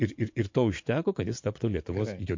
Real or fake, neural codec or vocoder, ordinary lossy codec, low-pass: fake; vocoder, 24 kHz, 100 mel bands, Vocos; MP3, 48 kbps; 7.2 kHz